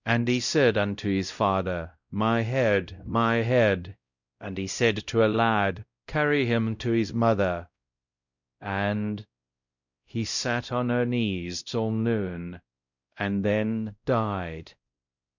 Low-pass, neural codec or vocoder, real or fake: 7.2 kHz; codec, 16 kHz, 0.5 kbps, X-Codec, WavLM features, trained on Multilingual LibriSpeech; fake